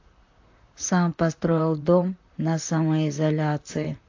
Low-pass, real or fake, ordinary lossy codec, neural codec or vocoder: 7.2 kHz; fake; AAC, 48 kbps; vocoder, 44.1 kHz, 128 mel bands, Pupu-Vocoder